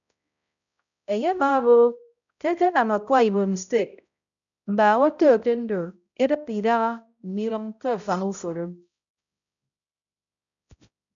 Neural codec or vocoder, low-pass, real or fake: codec, 16 kHz, 0.5 kbps, X-Codec, HuBERT features, trained on balanced general audio; 7.2 kHz; fake